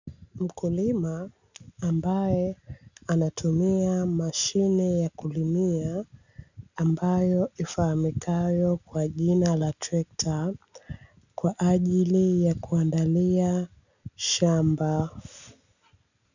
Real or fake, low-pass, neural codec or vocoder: real; 7.2 kHz; none